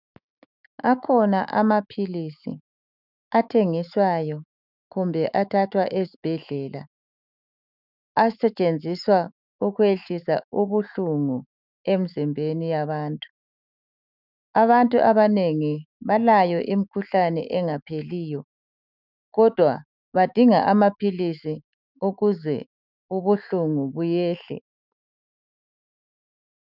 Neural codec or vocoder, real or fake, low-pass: autoencoder, 48 kHz, 128 numbers a frame, DAC-VAE, trained on Japanese speech; fake; 5.4 kHz